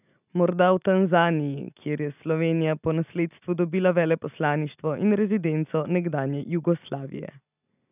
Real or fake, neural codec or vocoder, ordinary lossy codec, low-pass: real; none; none; 3.6 kHz